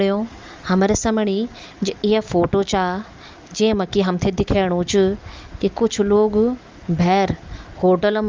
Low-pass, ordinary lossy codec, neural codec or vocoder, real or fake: 7.2 kHz; Opus, 32 kbps; none; real